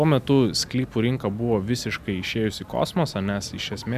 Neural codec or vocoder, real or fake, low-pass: none; real; 14.4 kHz